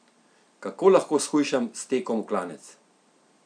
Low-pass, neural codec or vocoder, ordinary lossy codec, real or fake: 9.9 kHz; none; none; real